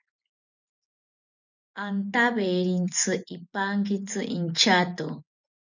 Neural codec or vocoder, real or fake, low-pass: vocoder, 24 kHz, 100 mel bands, Vocos; fake; 7.2 kHz